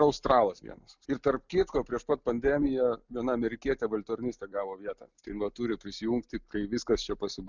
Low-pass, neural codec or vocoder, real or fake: 7.2 kHz; none; real